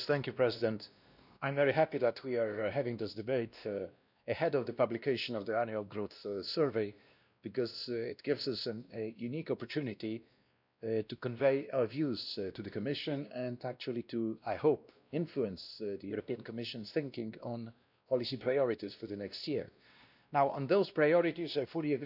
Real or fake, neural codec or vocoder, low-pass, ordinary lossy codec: fake; codec, 16 kHz, 1 kbps, X-Codec, WavLM features, trained on Multilingual LibriSpeech; 5.4 kHz; none